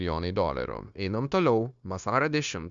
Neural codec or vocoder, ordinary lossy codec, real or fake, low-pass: codec, 16 kHz, 0.9 kbps, LongCat-Audio-Codec; Opus, 64 kbps; fake; 7.2 kHz